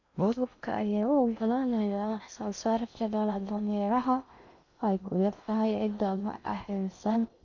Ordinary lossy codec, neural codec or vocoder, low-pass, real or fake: none; codec, 16 kHz in and 24 kHz out, 0.6 kbps, FocalCodec, streaming, 2048 codes; 7.2 kHz; fake